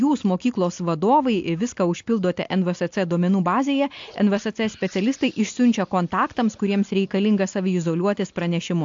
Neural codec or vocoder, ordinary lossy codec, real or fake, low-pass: none; AAC, 64 kbps; real; 7.2 kHz